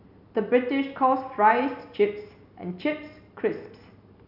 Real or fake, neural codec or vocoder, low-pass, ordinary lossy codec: real; none; 5.4 kHz; none